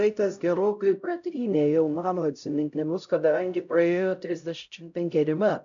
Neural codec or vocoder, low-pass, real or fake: codec, 16 kHz, 0.5 kbps, X-Codec, HuBERT features, trained on LibriSpeech; 7.2 kHz; fake